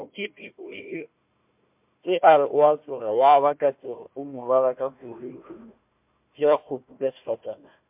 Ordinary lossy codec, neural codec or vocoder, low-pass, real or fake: MP3, 32 kbps; codec, 16 kHz, 1 kbps, FunCodec, trained on Chinese and English, 50 frames a second; 3.6 kHz; fake